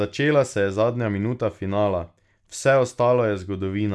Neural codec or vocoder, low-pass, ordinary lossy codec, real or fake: none; none; none; real